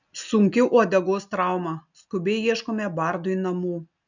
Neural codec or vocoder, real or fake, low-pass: none; real; 7.2 kHz